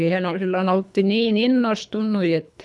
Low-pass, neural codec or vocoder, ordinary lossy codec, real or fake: none; codec, 24 kHz, 3 kbps, HILCodec; none; fake